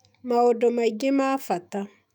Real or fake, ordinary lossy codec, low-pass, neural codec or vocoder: fake; none; 19.8 kHz; autoencoder, 48 kHz, 128 numbers a frame, DAC-VAE, trained on Japanese speech